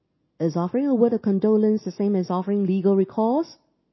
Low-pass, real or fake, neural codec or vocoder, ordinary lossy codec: 7.2 kHz; real; none; MP3, 24 kbps